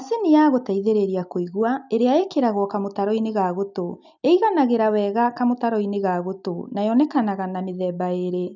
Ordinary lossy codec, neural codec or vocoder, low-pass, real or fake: none; none; 7.2 kHz; real